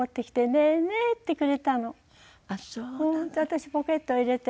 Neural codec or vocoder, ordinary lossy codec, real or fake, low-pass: none; none; real; none